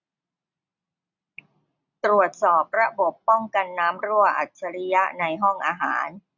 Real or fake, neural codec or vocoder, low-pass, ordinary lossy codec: real; none; 7.2 kHz; none